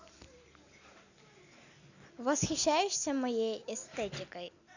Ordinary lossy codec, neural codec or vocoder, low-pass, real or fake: none; none; 7.2 kHz; real